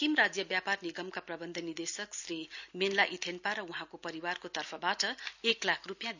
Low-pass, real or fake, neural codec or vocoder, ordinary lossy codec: 7.2 kHz; real; none; none